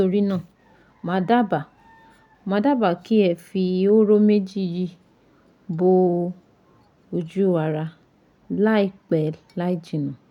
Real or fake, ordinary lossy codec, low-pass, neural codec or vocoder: real; none; 19.8 kHz; none